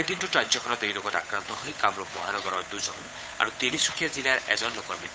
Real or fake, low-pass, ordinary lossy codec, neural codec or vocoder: fake; none; none; codec, 16 kHz, 8 kbps, FunCodec, trained on Chinese and English, 25 frames a second